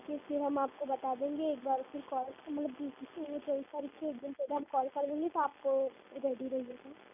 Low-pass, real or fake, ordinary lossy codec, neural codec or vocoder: 3.6 kHz; real; none; none